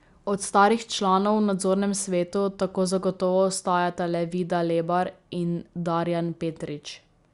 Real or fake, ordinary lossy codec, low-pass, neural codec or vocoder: real; Opus, 64 kbps; 10.8 kHz; none